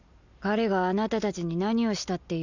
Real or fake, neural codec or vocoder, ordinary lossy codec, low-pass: real; none; none; 7.2 kHz